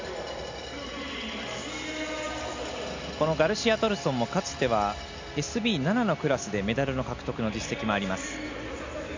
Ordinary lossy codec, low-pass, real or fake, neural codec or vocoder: MP3, 64 kbps; 7.2 kHz; real; none